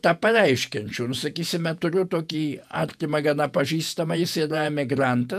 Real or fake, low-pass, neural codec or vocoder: fake; 14.4 kHz; vocoder, 48 kHz, 128 mel bands, Vocos